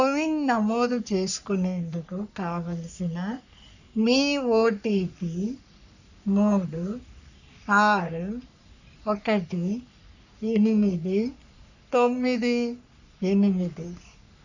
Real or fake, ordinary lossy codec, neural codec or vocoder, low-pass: fake; none; codec, 44.1 kHz, 3.4 kbps, Pupu-Codec; 7.2 kHz